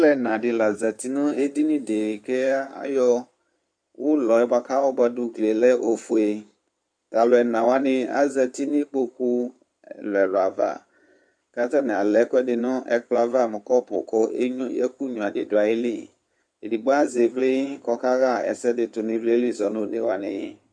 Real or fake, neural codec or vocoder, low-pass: fake; codec, 16 kHz in and 24 kHz out, 2.2 kbps, FireRedTTS-2 codec; 9.9 kHz